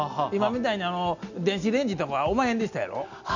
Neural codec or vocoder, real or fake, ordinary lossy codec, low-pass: none; real; none; 7.2 kHz